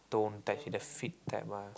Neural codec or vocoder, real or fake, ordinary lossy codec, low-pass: none; real; none; none